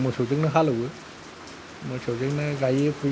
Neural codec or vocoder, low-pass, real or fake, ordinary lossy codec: none; none; real; none